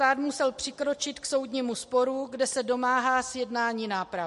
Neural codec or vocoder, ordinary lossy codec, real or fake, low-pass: none; MP3, 48 kbps; real; 14.4 kHz